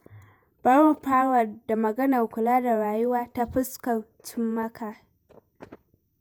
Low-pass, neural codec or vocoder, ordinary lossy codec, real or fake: none; vocoder, 48 kHz, 128 mel bands, Vocos; none; fake